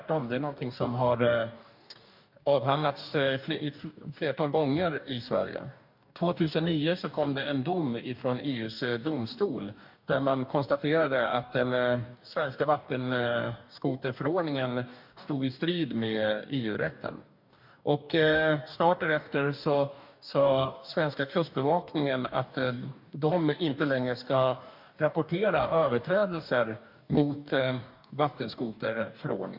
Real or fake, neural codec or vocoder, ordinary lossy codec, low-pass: fake; codec, 44.1 kHz, 2.6 kbps, DAC; MP3, 48 kbps; 5.4 kHz